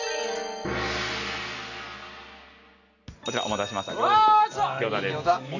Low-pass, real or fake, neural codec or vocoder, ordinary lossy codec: 7.2 kHz; real; none; none